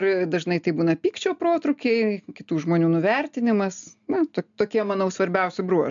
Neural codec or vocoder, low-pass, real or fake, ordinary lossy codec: none; 7.2 kHz; real; MP3, 64 kbps